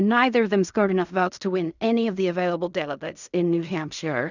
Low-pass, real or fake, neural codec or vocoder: 7.2 kHz; fake; codec, 16 kHz in and 24 kHz out, 0.4 kbps, LongCat-Audio-Codec, fine tuned four codebook decoder